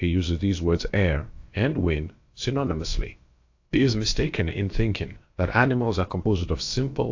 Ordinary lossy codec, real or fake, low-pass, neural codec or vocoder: AAC, 48 kbps; fake; 7.2 kHz; codec, 16 kHz, about 1 kbps, DyCAST, with the encoder's durations